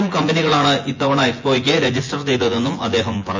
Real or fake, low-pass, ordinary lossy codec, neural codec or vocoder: fake; 7.2 kHz; MP3, 48 kbps; vocoder, 24 kHz, 100 mel bands, Vocos